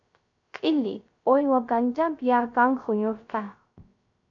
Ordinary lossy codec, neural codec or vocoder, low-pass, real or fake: AAC, 64 kbps; codec, 16 kHz, 0.3 kbps, FocalCodec; 7.2 kHz; fake